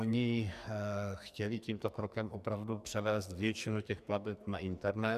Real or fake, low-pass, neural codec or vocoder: fake; 14.4 kHz; codec, 44.1 kHz, 2.6 kbps, SNAC